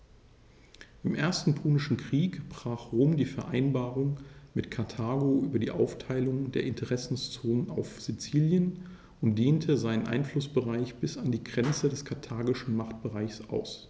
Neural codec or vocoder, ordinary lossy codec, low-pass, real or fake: none; none; none; real